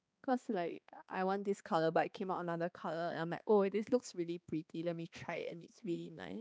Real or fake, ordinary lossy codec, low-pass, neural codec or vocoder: fake; none; none; codec, 16 kHz, 2 kbps, X-Codec, HuBERT features, trained on balanced general audio